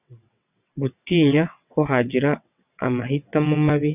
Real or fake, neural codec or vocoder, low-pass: fake; vocoder, 22.05 kHz, 80 mel bands, WaveNeXt; 3.6 kHz